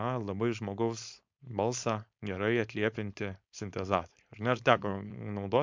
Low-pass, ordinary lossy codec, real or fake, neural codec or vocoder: 7.2 kHz; MP3, 64 kbps; fake; codec, 16 kHz, 4.8 kbps, FACodec